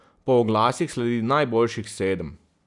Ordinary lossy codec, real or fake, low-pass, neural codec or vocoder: none; real; 10.8 kHz; none